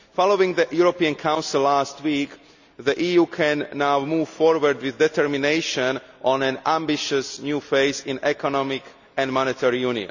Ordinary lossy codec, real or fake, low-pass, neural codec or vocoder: none; real; 7.2 kHz; none